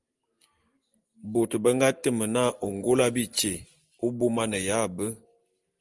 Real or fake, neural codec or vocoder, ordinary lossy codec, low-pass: real; none; Opus, 24 kbps; 10.8 kHz